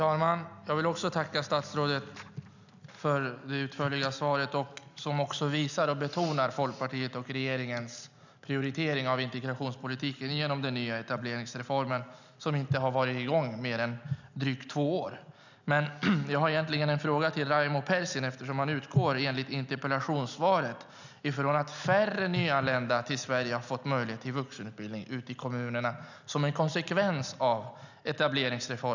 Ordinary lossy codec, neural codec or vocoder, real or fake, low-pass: none; none; real; 7.2 kHz